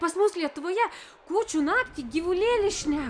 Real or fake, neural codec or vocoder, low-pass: real; none; 9.9 kHz